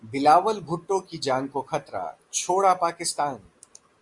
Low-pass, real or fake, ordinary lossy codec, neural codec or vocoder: 10.8 kHz; real; Opus, 64 kbps; none